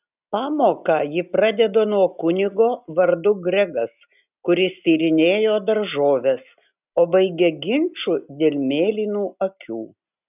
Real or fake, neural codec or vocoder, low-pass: real; none; 3.6 kHz